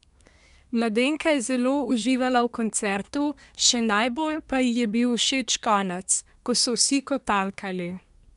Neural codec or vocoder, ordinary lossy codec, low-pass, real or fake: codec, 24 kHz, 1 kbps, SNAC; none; 10.8 kHz; fake